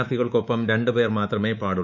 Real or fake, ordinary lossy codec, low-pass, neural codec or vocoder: fake; none; 7.2 kHz; codec, 24 kHz, 3.1 kbps, DualCodec